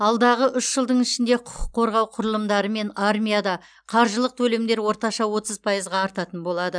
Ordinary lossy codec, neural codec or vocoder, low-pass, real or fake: none; none; 9.9 kHz; real